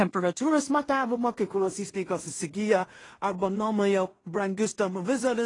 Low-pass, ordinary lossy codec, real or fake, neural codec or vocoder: 10.8 kHz; AAC, 32 kbps; fake; codec, 16 kHz in and 24 kHz out, 0.4 kbps, LongCat-Audio-Codec, two codebook decoder